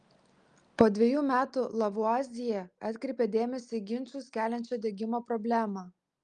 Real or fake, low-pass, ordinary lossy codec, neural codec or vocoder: real; 9.9 kHz; Opus, 32 kbps; none